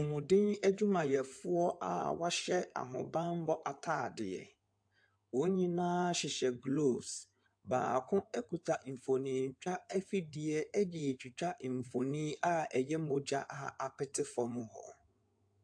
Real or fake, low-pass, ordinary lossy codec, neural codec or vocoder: fake; 9.9 kHz; AAC, 64 kbps; codec, 16 kHz in and 24 kHz out, 2.2 kbps, FireRedTTS-2 codec